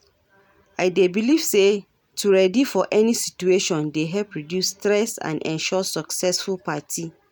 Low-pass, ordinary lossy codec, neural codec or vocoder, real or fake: none; none; none; real